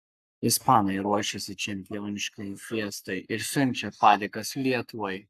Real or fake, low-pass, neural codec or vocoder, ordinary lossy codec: fake; 14.4 kHz; codec, 44.1 kHz, 7.8 kbps, Pupu-Codec; AAC, 96 kbps